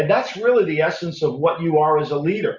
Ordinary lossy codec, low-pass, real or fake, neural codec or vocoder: Opus, 64 kbps; 7.2 kHz; real; none